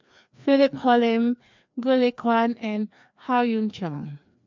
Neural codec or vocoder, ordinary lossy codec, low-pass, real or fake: codec, 16 kHz, 2 kbps, FreqCodec, larger model; MP3, 64 kbps; 7.2 kHz; fake